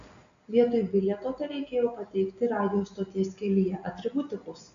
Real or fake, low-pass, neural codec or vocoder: real; 7.2 kHz; none